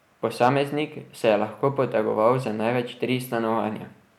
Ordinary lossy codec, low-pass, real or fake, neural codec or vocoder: none; 19.8 kHz; real; none